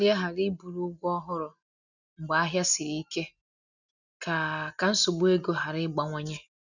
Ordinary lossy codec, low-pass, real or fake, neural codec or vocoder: none; 7.2 kHz; real; none